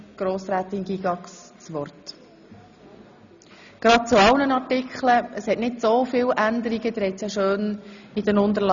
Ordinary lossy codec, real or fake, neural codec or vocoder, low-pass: none; real; none; 7.2 kHz